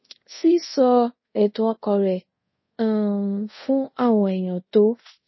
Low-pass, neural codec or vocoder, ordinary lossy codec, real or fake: 7.2 kHz; codec, 24 kHz, 0.5 kbps, DualCodec; MP3, 24 kbps; fake